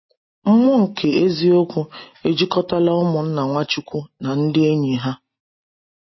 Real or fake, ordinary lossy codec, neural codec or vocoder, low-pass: real; MP3, 24 kbps; none; 7.2 kHz